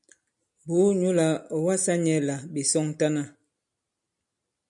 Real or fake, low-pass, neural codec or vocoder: real; 10.8 kHz; none